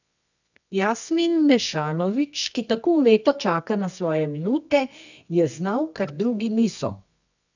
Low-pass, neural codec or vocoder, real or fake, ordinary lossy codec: 7.2 kHz; codec, 24 kHz, 0.9 kbps, WavTokenizer, medium music audio release; fake; none